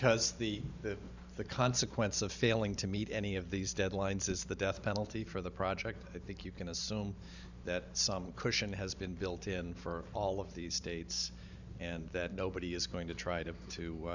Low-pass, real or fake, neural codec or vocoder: 7.2 kHz; fake; vocoder, 44.1 kHz, 128 mel bands every 512 samples, BigVGAN v2